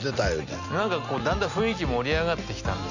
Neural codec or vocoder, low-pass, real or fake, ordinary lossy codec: none; 7.2 kHz; real; none